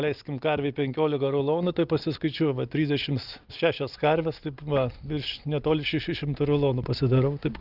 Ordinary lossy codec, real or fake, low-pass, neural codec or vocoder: Opus, 32 kbps; real; 5.4 kHz; none